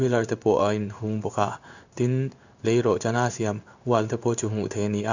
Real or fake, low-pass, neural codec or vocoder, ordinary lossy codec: fake; 7.2 kHz; codec, 16 kHz in and 24 kHz out, 1 kbps, XY-Tokenizer; none